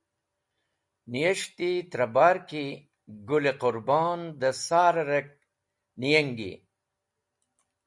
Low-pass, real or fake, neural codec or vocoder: 10.8 kHz; real; none